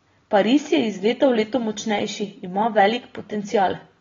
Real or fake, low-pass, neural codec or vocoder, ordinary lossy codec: real; 7.2 kHz; none; AAC, 24 kbps